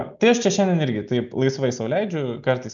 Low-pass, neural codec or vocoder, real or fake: 7.2 kHz; none; real